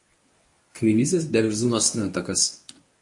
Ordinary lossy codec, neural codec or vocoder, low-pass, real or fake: MP3, 48 kbps; codec, 24 kHz, 0.9 kbps, WavTokenizer, medium speech release version 1; 10.8 kHz; fake